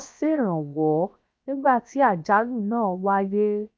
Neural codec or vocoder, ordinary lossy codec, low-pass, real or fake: codec, 16 kHz, 0.7 kbps, FocalCodec; none; none; fake